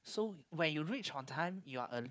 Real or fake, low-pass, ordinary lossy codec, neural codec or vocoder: fake; none; none; codec, 16 kHz, 4 kbps, FunCodec, trained on Chinese and English, 50 frames a second